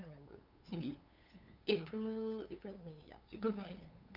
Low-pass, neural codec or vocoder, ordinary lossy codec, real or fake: 5.4 kHz; codec, 16 kHz, 8 kbps, FunCodec, trained on LibriTTS, 25 frames a second; none; fake